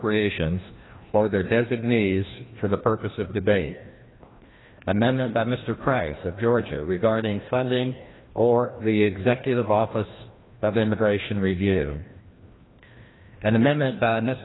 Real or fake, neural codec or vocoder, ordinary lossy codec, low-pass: fake; codec, 16 kHz, 1 kbps, FreqCodec, larger model; AAC, 16 kbps; 7.2 kHz